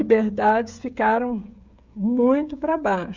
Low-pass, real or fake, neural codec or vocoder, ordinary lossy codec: 7.2 kHz; fake; codec, 16 kHz, 16 kbps, FreqCodec, smaller model; Opus, 64 kbps